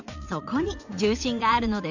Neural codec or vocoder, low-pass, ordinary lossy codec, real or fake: vocoder, 44.1 kHz, 80 mel bands, Vocos; 7.2 kHz; none; fake